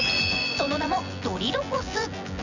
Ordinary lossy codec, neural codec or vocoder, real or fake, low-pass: none; vocoder, 24 kHz, 100 mel bands, Vocos; fake; 7.2 kHz